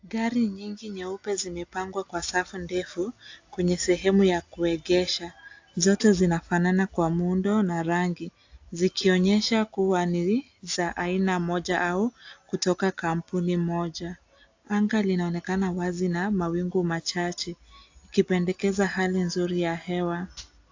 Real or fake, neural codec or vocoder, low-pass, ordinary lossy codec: real; none; 7.2 kHz; AAC, 48 kbps